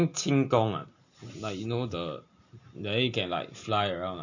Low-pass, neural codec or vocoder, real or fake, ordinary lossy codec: 7.2 kHz; none; real; none